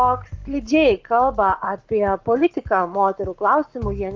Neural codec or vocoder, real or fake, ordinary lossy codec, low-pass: codec, 44.1 kHz, 7.8 kbps, Pupu-Codec; fake; Opus, 32 kbps; 7.2 kHz